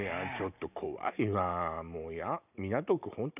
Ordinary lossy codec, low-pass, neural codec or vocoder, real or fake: none; 3.6 kHz; none; real